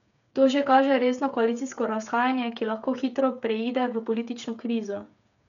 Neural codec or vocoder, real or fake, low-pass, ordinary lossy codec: codec, 16 kHz, 8 kbps, FreqCodec, smaller model; fake; 7.2 kHz; none